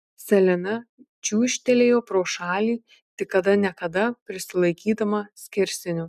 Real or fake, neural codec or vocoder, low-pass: real; none; 14.4 kHz